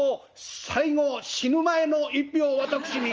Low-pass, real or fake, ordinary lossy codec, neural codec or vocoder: 7.2 kHz; real; Opus, 24 kbps; none